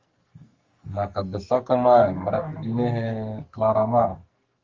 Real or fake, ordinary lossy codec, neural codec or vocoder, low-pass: fake; Opus, 32 kbps; codec, 44.1 kHz, 2.6 kbps, SNAC; 7.2 kHz